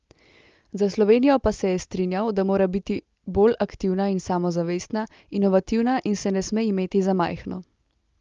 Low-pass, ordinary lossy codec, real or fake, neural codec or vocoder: 7.2 kHz; Opus, 32 kbps; real; none